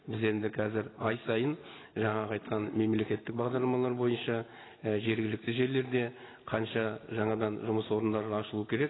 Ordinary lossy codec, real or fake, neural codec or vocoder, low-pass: AAC, 16 kbps; real; none; 7.2 kHz